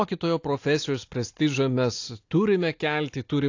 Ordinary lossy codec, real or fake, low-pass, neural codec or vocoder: AAC, 48 kbps; real; 7.2 kHz; none